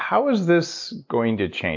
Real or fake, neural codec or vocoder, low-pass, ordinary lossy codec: real; none; 7.2 kHz; MP3, 64 kbps